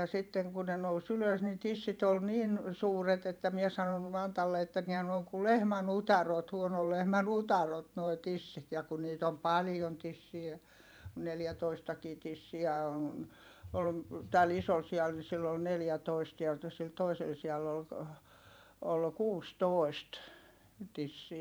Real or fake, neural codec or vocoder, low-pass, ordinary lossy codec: fake; vocoder, 44.1 kHz, 128 mel bands every 512 samples, BigVGAN v2; none; none